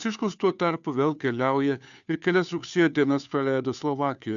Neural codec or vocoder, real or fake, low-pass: codec, 16 kHz, 2 kbps, FunCodec, trained on Chinese and English, 25 frames a second; fake; 7.2 kHz